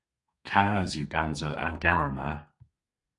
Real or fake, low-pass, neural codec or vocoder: fake; 10.8 kHz; codec, 32 kHz, 1.9 kbps, SNAC